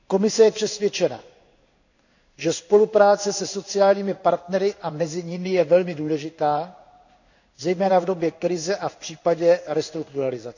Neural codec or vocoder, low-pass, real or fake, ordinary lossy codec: codec, 16 kHz in and 24 kHz out, 1 kbps, XY-Tokenizer; 7.2 kHz; fake; none